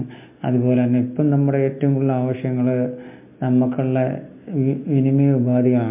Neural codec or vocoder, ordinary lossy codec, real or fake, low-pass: none; MP3, 32 kbps; real; 3.6 kHz